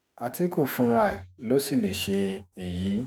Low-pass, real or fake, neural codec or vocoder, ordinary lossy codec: none; fake; autoencoder, 48 kHz, 32 numbers a frame, DAC-VAE, trained on Japanese speech; none